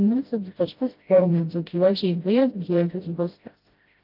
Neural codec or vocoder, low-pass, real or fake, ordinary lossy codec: codec, 16 kHz, 0.5 kbps, FreqCodec, smaller model; 5.4 kHz; fake; Opus, 24 kbps